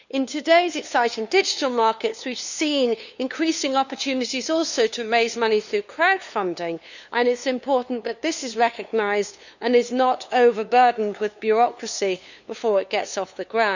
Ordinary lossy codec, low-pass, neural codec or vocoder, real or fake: none; 7.2 kHz; codec, 16 kHz, 2 kbps, FunCodec, trained on LibriTTS, 25 frames a second; fake